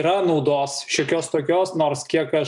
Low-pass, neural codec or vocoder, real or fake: 10.8 kHz; none; real